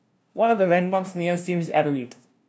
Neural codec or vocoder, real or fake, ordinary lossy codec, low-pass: codec, 16 kHz, 0.5 kbps, FunCodec, trained on LibriTTS, 25 frames a second; fake; none; none